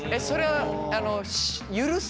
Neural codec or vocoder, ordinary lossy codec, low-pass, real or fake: none; none; none; real